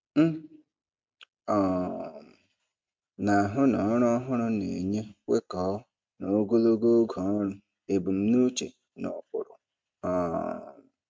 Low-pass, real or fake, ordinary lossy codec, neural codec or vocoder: none; real; none; none